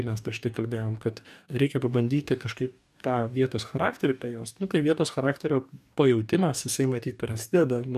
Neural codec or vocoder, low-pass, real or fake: codec, 44.1 kHz, 2.6 kbps, DAC; 14.4 kHz; fake